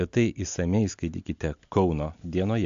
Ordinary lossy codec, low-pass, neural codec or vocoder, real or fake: AAC, 64 kbps; 7.2 kHz; none; real